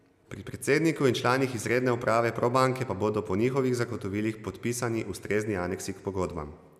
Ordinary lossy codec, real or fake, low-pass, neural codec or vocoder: none; real; 14.4 kHz; none